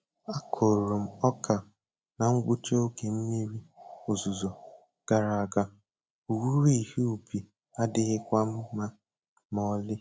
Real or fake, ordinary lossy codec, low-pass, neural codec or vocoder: real; none; none; none